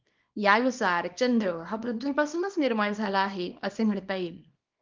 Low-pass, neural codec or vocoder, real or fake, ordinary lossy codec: 7.2 kHz; codec, 24 kHz, 0.9 kbps, WavTokenizer, small release; fake; Opus, 32 kbps